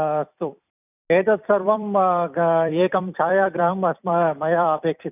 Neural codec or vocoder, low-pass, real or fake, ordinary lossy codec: vocoder, 44.1 kHz, 128 mel bands every 256 samples, BigVGAN v2; 3.6 kHz; fake; none